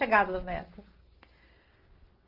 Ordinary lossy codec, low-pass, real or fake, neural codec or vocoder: Opus, 32 kbps; 5.4 kHz; real; none